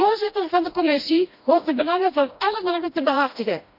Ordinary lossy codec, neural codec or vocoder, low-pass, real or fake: none; codec, 16 kHz, 1 kbps, FreqCodec, smaller model; 5.4 kHz; fake